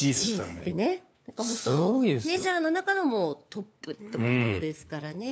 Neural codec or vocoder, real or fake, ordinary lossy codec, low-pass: codec, 16 kHz, 4 kbps, FunCodec, trained on LibriTTS, 50 frames a second; fake; none; none